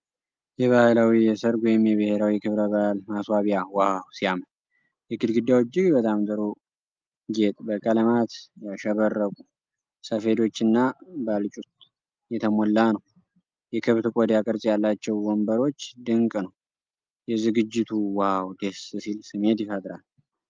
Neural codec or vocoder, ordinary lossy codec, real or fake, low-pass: none; Opus, 24 kbps; real; 7.2 kHz